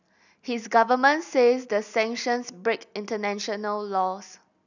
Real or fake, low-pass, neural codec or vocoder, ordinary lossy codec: real; 7.2 kHz; none; none